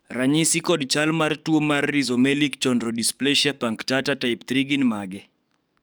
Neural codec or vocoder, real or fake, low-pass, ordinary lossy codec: codec, 44.1 kHz, 7.8 kbps, DAC; fake; none; none